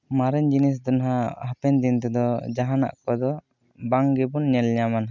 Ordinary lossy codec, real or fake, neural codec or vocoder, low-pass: none; real; none; 7.2 kHz